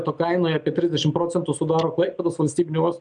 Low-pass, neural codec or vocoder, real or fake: 9.9 kHz; none; real